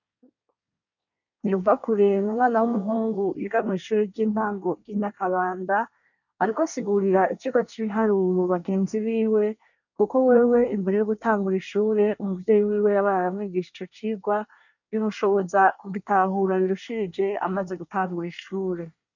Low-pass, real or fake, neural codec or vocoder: 7.2 kHz; fake; codec, 24 kHz, 1 kbps, SNAC